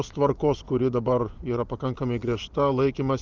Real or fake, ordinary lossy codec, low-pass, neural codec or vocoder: fake; Opus, 32 kbps; 7.2 kHz; vocoder, 24 kHz, 100 mel bands, Vocos